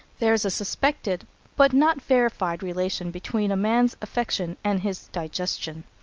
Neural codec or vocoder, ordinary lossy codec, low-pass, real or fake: none; Opus, 24 kbps; 7.2 kHz; real